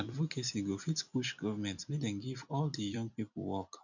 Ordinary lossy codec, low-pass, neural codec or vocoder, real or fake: none; 7.2 kHz; vocoder, 44.1 kHz, 128 mel bands every 256 samples, BigVGAN v2; fake